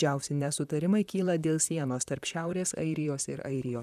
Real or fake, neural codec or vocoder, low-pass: fake; vocoder, 44.1 kHz, 128 mel bands, Pupu-Vocoder; 14.4 kHz